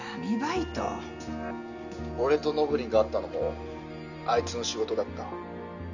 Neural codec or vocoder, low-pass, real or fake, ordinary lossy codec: none; 7.2 kHz; real; none